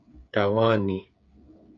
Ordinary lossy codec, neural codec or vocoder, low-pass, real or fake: AAC, 64 kbps; codec, 16 kHz, 8 kbps, FreqCodec, smaller model; 7.2 kHz; fake